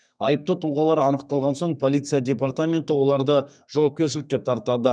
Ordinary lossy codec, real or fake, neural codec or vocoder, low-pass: none; fake; codec, 32 kHz, 1.9 kbps, SNAC; 9.9 kHz